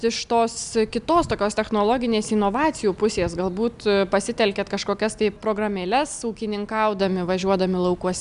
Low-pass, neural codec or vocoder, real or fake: 10.8 kHz; none; real